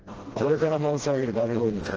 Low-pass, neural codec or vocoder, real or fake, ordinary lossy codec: 7.2 kHz; codec, 16 kHz, 1 kbps, FreqCodec, smaller model; fake; Opus, 16 kbps